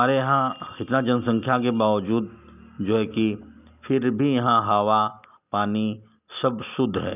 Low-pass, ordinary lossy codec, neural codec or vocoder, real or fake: 3.6 kHz; none; none; real